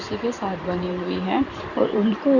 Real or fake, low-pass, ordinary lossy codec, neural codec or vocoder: fake; 7.2 kHz; Opus, 64 kbps; vocoder, 44.1 kHz, 128 mel bands every 512 samples, BigVGAN v2